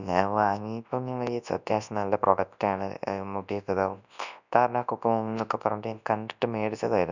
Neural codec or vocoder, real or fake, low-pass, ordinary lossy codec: codec, 24 kHz, 0.9 kbps, WavTokenizer, large speech release; fake; 7.2 kHz; none